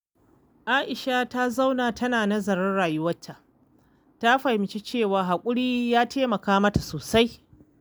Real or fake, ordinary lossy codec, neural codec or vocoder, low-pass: real; none; none; none